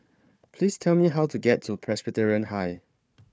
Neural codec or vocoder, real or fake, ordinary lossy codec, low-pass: codec, 16 kHz, 4 kbps, FunCodec, trained on Chinese and English, 50 frames a second; fake; none; none